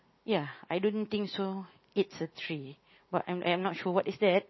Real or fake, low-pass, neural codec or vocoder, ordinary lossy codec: real; 7.2 kHz; none; MP3, 24 kbps